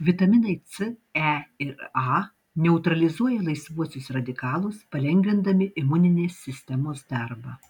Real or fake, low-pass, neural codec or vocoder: real; 19.8 kHz; none